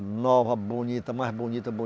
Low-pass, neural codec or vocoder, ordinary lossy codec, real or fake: none; none; none; real